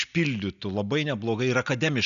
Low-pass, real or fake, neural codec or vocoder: 7.2 kHz; real; none